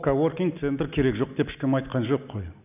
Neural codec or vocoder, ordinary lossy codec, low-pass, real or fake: none; none; 3.6 kHz; real